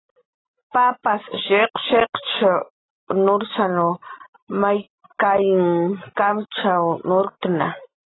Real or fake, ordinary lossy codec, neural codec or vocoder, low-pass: real; AAC, 16 kbps; none; 7.2 kHz